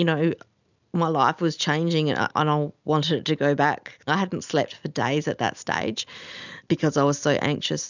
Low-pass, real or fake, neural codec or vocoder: 7.2 kHz; real; none